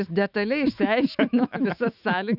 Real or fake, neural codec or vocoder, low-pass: fake; autoencoder, 48 kHz, 128 numbers a frame, DAC-VAE, trained on Japanese speech; 5.4 kHz